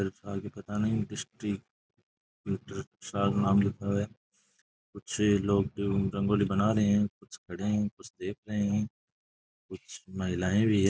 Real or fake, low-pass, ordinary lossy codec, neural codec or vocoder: real; none; none; none